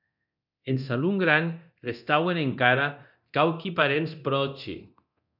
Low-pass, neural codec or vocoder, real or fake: 5.4 kHz; codec, 24 kHz, 0.9 kbps, DualCodec; fake